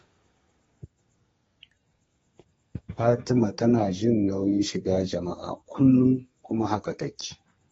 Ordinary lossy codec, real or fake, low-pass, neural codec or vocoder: AAC, 24 kbps; fake; 14.4 kHz; codec, 32 kHz, 1.9 kbps, SNAC